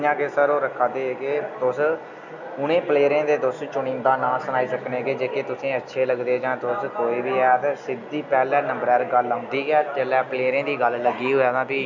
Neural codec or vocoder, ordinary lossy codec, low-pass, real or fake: none; none; 7.2 kHz; real